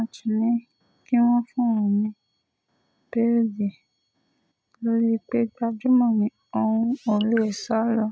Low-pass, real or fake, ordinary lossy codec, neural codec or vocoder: none; real; none; none